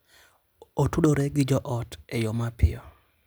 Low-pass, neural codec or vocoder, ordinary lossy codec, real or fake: none; none; none; real